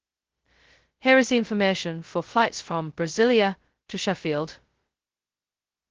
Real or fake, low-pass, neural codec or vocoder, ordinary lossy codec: fake; 7.2 kHz; codec, 16 kHz, 0.2 kbps, FocalCodec; Opus, 16 kbps